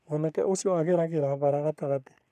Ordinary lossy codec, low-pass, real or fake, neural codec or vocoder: none; 14.4 kHz; fake; codec, 44.1 kHz, 3.4 kbps, Pupu-Codec